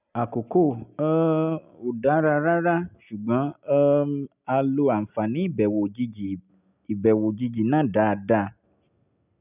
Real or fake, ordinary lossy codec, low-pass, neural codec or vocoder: real; none; 3.6 kHz; none